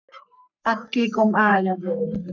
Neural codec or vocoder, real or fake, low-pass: codec, 44.1 kHz, 3.4 kbps, Pupu-Codec; fake; 7.2 kHz